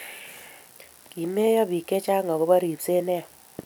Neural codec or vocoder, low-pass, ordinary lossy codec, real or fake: none; none; none; real